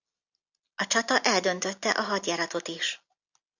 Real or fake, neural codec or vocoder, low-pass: real; none; 7.2 kHz